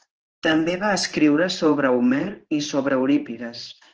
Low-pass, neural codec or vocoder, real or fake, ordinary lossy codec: 7.2 kHz; codec, 16 kHz in and 24 kHz out, 1 kbps, XY-Tokenizer; fake; Opus, 16 kbps